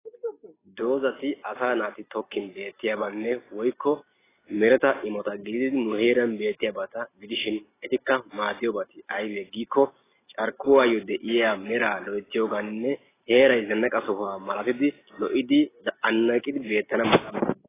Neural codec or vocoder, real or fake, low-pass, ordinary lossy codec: none; real; 3.6 kHz; AAC, 16 kbps